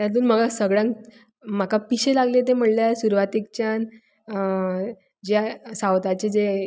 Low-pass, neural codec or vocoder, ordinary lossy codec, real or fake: none; none; none; real